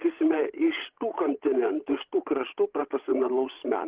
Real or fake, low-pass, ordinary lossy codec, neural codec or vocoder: fake; 3.6 kHz; Opus, 16 kbps; codec, 16 kHz, 16 kbps, FreqCodec, larger model